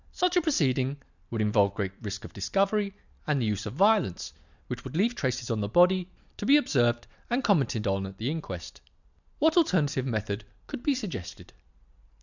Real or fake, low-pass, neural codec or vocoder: real; 7.2 kHz; none